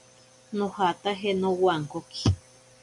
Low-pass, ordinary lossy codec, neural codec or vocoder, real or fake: 10.8 kHz; MP3, 96 kbps; none; real